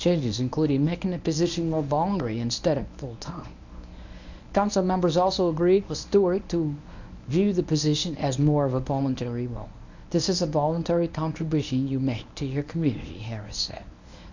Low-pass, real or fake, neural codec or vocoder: 7.2 kHz; fake; codec, 24 kHz, 0.9 kbps, WavTokenizer, medium speech release version 1